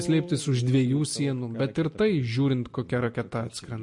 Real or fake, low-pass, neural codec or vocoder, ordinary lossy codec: real; 10.8 kHz; none; MP3, 48 kbps